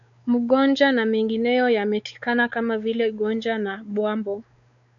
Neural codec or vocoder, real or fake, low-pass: codec, 16 kHz, 4 kbps, X-Codec, WavLM features, trained on Multilingual LibriSpeech; fake; 7.2 kHz